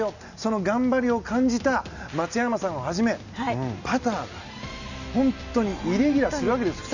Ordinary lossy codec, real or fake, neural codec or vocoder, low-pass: none; real; none; 7.2 kHz